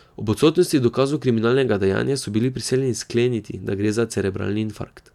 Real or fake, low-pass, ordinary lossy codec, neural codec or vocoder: real; 19.8 kHz; none; none